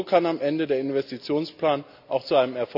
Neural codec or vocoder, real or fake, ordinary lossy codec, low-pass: none; real; none; 5.4 kHz